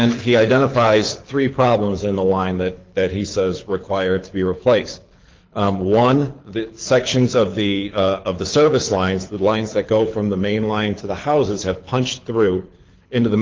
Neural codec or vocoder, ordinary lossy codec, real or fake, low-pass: codec, 24 kHz, 6 kbps, HILCodec; Opus, 32 kbps; fake; 7.2 kHz